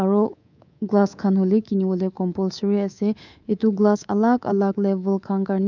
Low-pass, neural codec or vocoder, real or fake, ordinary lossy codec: 7.2 kHz; codec, 24 kHz, 3.1 kbps, DualCodec; fake; none